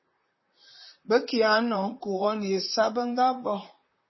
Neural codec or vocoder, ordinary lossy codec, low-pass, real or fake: vocoder, 44.1 kHz, 128 mel bands, Pupu-Vocoder; MP3, 24 kbps; 7.2 kHz; fake